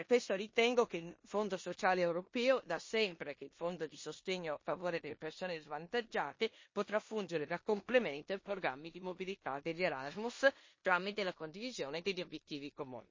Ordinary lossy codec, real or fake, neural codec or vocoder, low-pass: MP3, 32 kbps; fake; codec, 16 kHz in and 24 kHz out, 0.9 kbps, LongCat-Audio-Codec, four codebook decoder; 7.2 kHz